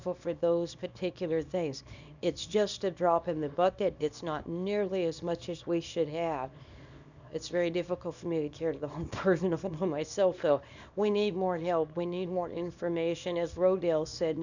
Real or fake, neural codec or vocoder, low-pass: fake; codec, 24 kHz, 0.9 kbps, WavTokenizer, small release; 7.2 kHz